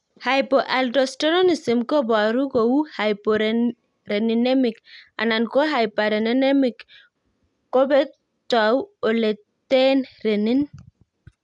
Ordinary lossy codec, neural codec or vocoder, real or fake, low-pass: none; none; real; 10.8 kHz